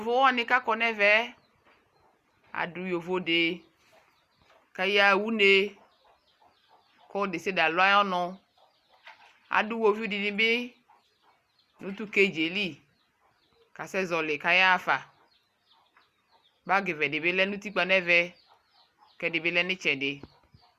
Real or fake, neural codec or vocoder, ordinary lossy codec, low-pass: real; none; Opus, 64 kbps; 14.4 kHz